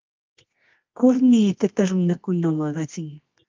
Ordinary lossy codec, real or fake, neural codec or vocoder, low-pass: Opus, 24 kbps; fake; codec, 24 kHz, 0.9 kbps, WavTokenizer, medium music audio release; 7.2 kHz